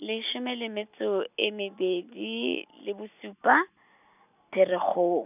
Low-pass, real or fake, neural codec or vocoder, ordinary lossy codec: 3.6 kHz; real; none; none